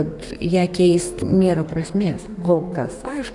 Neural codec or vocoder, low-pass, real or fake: codec, 32 kHz, 1.9 kbps, SNAC; 10.8 kHz; fake